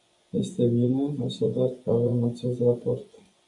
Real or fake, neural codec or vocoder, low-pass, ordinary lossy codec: fake; vocoder, 24 kHz, 100 mel bands, Vocos; 10.8 kHz; MP3, 96 kbps